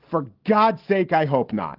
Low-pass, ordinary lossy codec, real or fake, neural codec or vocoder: 5.4 kHz; Opus, 16 kbps; real; none